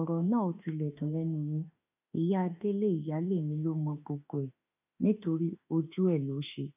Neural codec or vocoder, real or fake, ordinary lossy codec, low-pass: autoencoder, 48 kHz, 32 numbers a frame, DAC-VAE, trained on Japanese speech; fake; none; 3.6 kHz